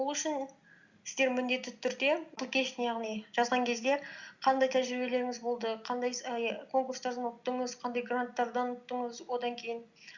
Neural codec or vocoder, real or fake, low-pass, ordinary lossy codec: none; real; 7.2 kHz; Opus, 64 kbps